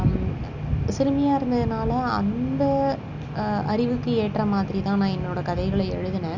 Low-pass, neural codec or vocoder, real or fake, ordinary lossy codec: 7.2 kHz; none; real; Opus, 64 kbps